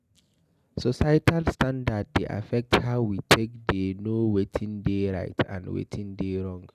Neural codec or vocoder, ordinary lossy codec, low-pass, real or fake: none; none; 14.4 kHz; real